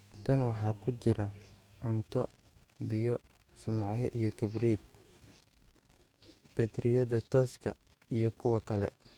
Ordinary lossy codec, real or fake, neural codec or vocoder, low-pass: none; fake; codec, 44.1 kHz, 2.6 kbps, DAC; 19.8 kHz